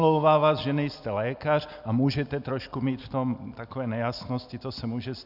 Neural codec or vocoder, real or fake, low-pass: none; real; 5.4 kHz